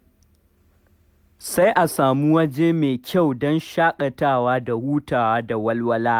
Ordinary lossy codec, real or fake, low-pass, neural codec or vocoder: none; real; 19.8 kHz; none